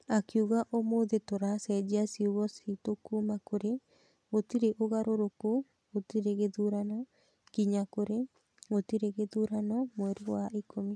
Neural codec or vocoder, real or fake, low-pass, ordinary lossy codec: none; real; none; none